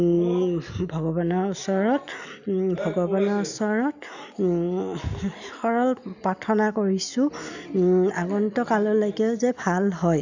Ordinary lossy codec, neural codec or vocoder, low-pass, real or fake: none; none; 7.2 kHz; real